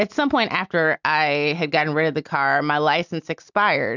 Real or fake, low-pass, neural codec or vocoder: real; 7.2 kHz; none